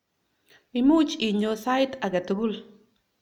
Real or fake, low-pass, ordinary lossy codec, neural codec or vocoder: real; 19.8 kHz; none; none